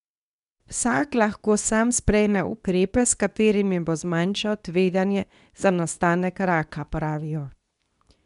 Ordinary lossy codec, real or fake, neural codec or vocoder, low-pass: none; fake; codec, 24 kHz, 0.9 kbps, WavTokenizer, medium speech release version 2; 10.8 kHz